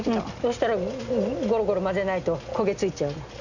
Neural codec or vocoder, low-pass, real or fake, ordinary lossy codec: none; 7.2 kHz; real; none